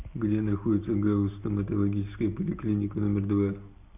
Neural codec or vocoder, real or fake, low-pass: none; real; 3.6 kHz